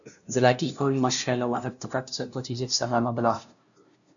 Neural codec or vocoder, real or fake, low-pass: codec, 16 kHz, 0.5 kbps, FunCodec, trained on LibriTTS, 25 frames a second; fake; 7.2 kHz